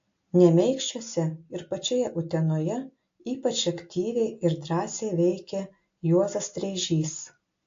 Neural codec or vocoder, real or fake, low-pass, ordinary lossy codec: none; real; 7.2 kHz; AAC, 48 kbps